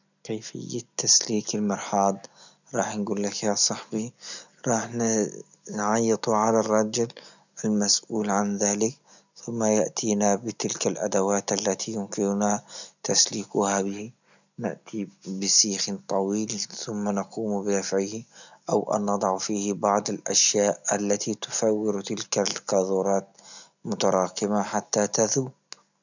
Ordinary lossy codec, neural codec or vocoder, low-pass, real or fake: none; none; 7.2 kHz; real